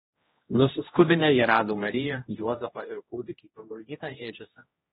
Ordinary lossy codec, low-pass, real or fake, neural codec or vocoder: AAC, 16 kbps; 7.2 kHz; fake; codec, 16 kHz, 1 kbps, X-Codec, HuBERT features, trained on general audio